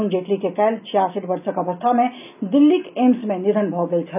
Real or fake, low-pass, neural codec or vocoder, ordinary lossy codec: real; 3.6 kHz; none; none